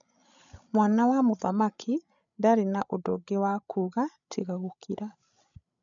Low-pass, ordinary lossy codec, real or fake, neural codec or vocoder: 7.2 kHz; none; fake; codec, 16 kHz, 16 kbps, FreqCodec, larger model